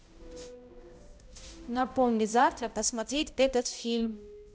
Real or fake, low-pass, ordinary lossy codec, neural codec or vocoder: fake; none; none; codec, 16 kHz, 0.5 kbps, X-Codec, HuBERT features, trained on balanced general audio